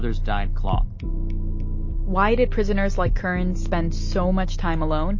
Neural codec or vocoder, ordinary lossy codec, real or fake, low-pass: none; MP3, 32 kbps; real; 7.2 kHz